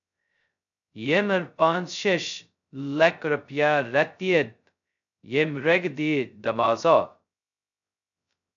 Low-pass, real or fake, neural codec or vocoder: 7.2 kHz; fake; codec, 16 kHz, 0.2 kbps, FocalCodec